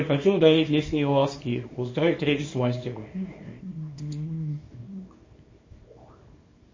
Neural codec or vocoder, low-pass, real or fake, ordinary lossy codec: codec, 24 kHz, 0.9 kbps, WavTokenizer, small release; 7.2 kHz; fake; MP3, 32 kbps